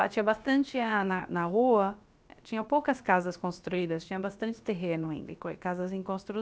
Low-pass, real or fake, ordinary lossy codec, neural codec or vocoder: none; fake; none; codec, 16 kHz, about 1 kbps, DyCAST, with the encoder's durations